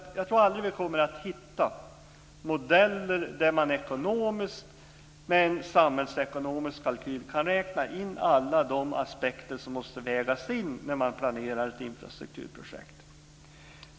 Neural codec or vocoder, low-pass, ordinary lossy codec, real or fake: none; none; none; real